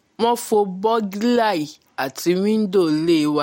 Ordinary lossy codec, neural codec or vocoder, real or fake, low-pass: MP3, 64 kbps; none; real; 19.8 kHz